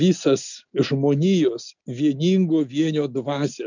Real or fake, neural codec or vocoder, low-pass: real; none; 7.2 kHz